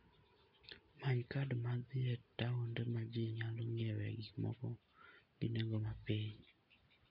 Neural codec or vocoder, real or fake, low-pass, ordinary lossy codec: none; real; 5.4 kHz; none